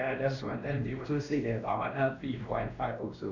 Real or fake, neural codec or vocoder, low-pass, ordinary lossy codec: fake; codec, 16 kHz, 1 kbps, X-Codec, WavLM features, trained on Multilingual LibriSpeech; 7.2 kHz; none